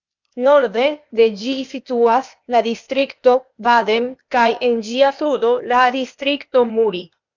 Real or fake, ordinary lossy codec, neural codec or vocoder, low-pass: fake; MP3, 64 kbps; codec, 16 kHz, 0.8 kbps, ZipCodec; 7.2 kHz